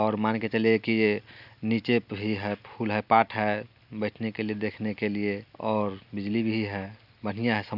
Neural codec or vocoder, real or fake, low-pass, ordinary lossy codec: none; real; 5.4 kHz; none